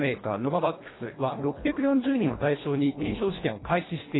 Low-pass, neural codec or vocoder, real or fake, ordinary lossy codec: 7.2 kHz; codec, 24 kHz, 1.5 kbps, HILCodec; fake; AAC, 16 kbps